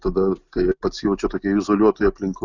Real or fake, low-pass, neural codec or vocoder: real; 7.2 kHz; none